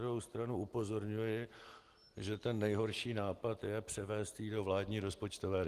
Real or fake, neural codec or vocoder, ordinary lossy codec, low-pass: real; none; Opus, 24 kbps; 14.4 kHz